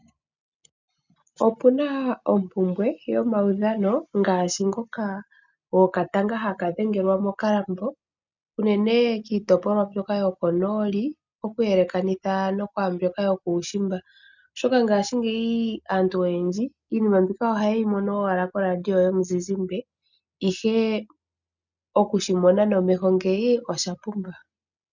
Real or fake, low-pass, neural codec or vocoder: real; 7.2 kHz; none